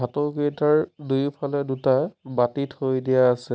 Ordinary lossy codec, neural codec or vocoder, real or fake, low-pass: none; none; real; none